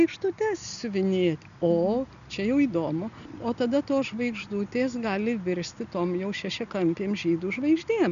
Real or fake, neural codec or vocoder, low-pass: real; none; 7.2 kHz